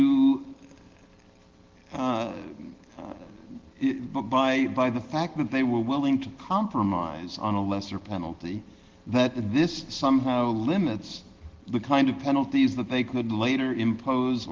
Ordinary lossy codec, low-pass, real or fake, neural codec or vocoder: Opus, 16 kbps; 7.2 kHz; real; none